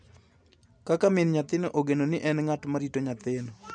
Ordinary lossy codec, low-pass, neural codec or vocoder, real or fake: none; 9.9 kHz; none; real